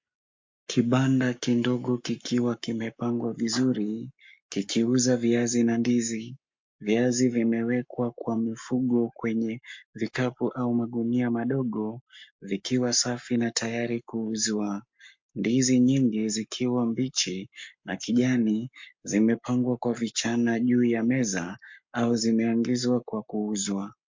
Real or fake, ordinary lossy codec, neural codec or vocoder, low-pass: fake; MP3, 48 kbps; codec, 44.1 kHz, 7.8 kbps, Pupu-Codec; 7.2 kHz